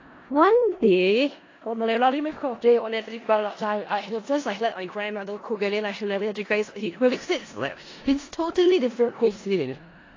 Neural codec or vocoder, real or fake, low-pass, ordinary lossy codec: codec, 16 kHz in and 24 kHz out, 0.4 kbps, LongCat-Audio-Codec, four codebook decoder; fake; 7.2 kHz; AAC, 32 kbps